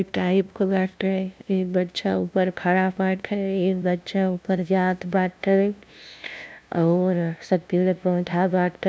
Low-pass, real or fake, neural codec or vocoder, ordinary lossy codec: none; fake; codec, 16 kHz, 0.5 kbps, FunCodec, trained on LibriTTS, 25 frames a second; none